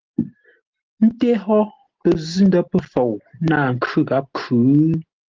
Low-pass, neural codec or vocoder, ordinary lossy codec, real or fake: 7.2 kHz; none; Opus, 16 kbps; real